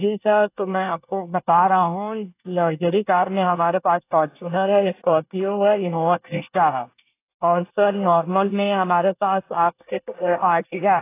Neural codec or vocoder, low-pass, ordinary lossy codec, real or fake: codec, 24 kHz, 1 kbps, SNAC; 3.6 kHz; AAC, 24 kbps; fake